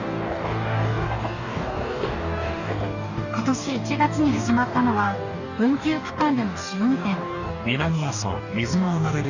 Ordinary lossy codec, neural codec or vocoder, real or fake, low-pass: none; codec, 44.1 kHz, 2.6 kbps, DAC; fake; 7.2 kHz